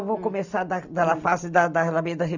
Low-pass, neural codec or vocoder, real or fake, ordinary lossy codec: 7.2 kHz; none; real; none